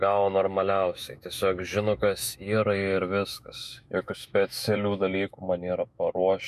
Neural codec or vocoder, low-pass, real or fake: autoencoder, 48 kHz, 128 numbers a frame, DAC-VAE, trained on Japanese speech; 14.4 kHz; fake